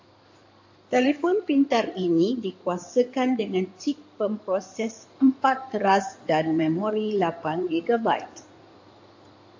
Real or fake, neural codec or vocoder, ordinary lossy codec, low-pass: fake; codec, 16 kHz in and 24 kHz out, 2.2 kbps, FireRedTTS-2 codec; AAC, 48 kbps; 7.2 kHz